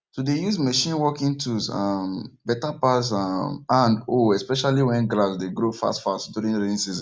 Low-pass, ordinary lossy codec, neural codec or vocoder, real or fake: none; none; none; real